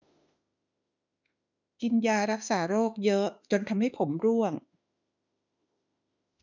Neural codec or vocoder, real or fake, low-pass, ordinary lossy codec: autoencoder, 48 kHz, 32 numbers a frame, DAC-VAE, trained on Japanese speech; fake; 7.2 kHz; none